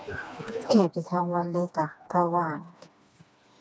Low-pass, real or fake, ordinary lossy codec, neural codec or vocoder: none; fake; none; codec, 16 kHz, 2 kbps, FreqCodec, smaller model